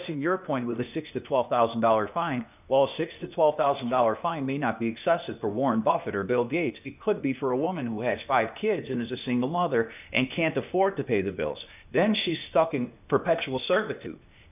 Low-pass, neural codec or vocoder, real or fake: 3.6 kHz; codec, 16 kHz, 0.8 kbps, ZipCodec; fake